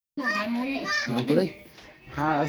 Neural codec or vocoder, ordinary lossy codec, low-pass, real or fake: codec, 44.1 kHz, 2.6 kbps, SNAC; none; none; fake